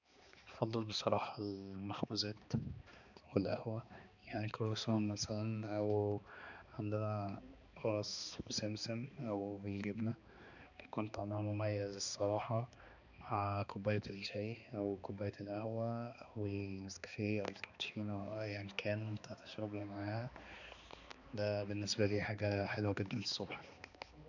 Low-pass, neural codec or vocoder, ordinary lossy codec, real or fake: 7.2 kHz; codec, 16 kHz, 2 kbps, X-Codec, HuBERT features, trained on balanced general audio; none; fake